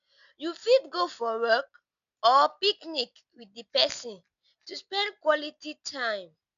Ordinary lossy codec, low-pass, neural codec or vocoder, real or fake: none; 7.2 kHz; none; real